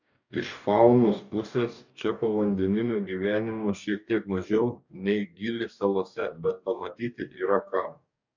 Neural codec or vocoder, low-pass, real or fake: codec, 44.1 kHz, 2.6 kbps, DAC; 7.2 kHz; fake